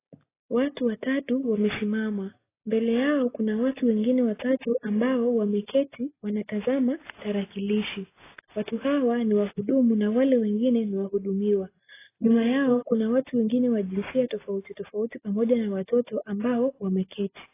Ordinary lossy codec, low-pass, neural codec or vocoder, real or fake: AAC, 16 kbps; 3.6 kHz; none; real